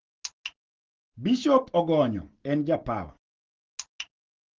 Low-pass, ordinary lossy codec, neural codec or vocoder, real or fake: 7.2 kHz; Opus, 16 kbps; none; real